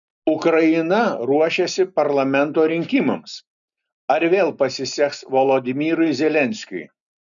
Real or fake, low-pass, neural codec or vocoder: real; 7.2 kHz; none